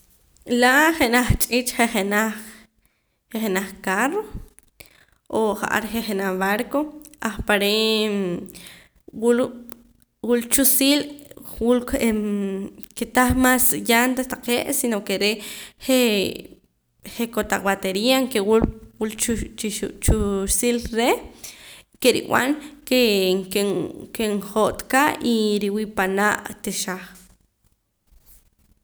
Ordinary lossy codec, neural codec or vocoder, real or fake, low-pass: none; none; real; none